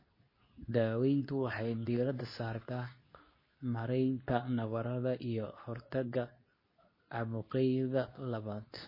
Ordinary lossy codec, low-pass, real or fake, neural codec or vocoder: MP3, 24 kbps; 5.4 kHz; fake; codec, 24 kHz, 0.9 kbps, WavTokenizer, medium speech release version 2